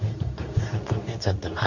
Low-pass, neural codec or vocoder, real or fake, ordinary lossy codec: 7.2 kHz; codec, 24 kHz, 0.9 kbps, WavTokenizer, medium speech release version 2; fake; none